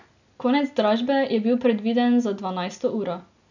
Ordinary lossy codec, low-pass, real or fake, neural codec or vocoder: none; 7.2 kHz; real; none